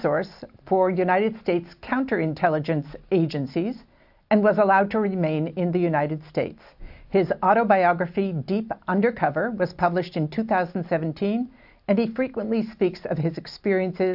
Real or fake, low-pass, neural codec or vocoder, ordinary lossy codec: real; 5.4 kHz; none; AAC, 48 kbps